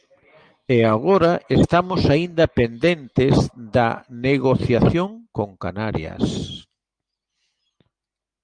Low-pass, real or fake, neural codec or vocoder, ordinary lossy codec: 9.9 kHz; real; none; Opus, 32 kbps